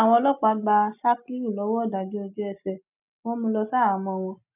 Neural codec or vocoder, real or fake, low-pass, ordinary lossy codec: none; real; 3.6 kHz; none